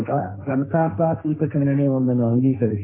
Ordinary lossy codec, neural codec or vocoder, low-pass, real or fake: MP3, 24 kbps; codec, 16 kHz, 1.1 kbps, Voila-Tokenizer; 3.6 kHz; fake